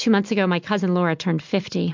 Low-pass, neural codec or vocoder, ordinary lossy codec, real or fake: 7.2 kHz; none; MP3, 64 kbps; real